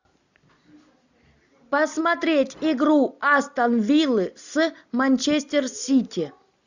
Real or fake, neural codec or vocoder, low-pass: real; none; 7.2 kHz